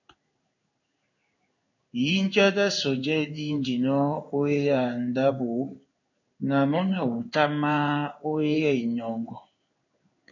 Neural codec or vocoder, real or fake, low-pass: codec, 16 kHz in and 24 kHz out, 1 kbps, XY-Tokenizer; fake; 7.2 kHz